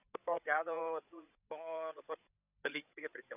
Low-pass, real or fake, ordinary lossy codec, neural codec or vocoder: 3.6 kHz; fake; none; codec, 16 kHz, 8 kbps, FreqCodec, larger model